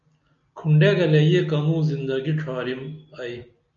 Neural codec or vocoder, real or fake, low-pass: none; real; 7.2 kHz